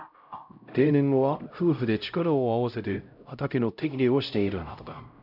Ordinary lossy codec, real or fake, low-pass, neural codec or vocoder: none; fake; 5.4 kHz; codec, 16 kHz, 0.5 kbps, X-Codec, HuBERT features, trained on LibriSpeech